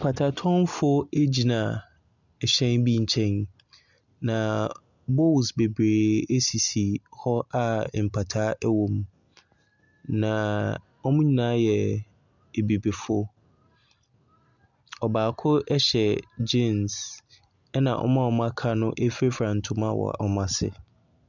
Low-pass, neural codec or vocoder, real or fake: 7.2 kHz; none; real